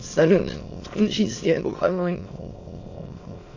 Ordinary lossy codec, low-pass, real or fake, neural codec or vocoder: AAC, 48 kbps; 7.2 kHz; fake; autoencoder, 22.05 kHz, a latent of 192 numbers a frame, VITS, trained on many speakers